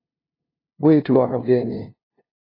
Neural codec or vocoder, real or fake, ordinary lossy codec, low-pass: codec, 16 kHz, 0.5 kbps, FunCodec, trained on LibriTTS, 25 frames a second; fake; AAC, 24 kbps; 5.4 kHz